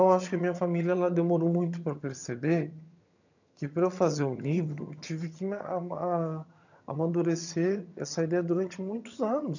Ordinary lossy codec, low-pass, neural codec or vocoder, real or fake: none; 7.2 kHz; vocoder, 22.05 kHz, 80 mel bands, HiFi-GAN; fake